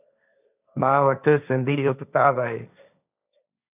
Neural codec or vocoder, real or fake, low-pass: codec, 16 kHz, 1.1 kbps, Voila-Tokenizer; fake; 3.6 kHz